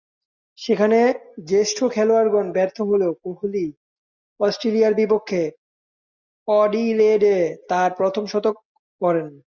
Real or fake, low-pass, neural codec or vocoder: real; 7.2 kHz; none